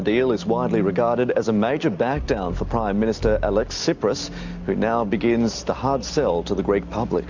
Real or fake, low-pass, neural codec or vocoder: real; 7.2 kHz; none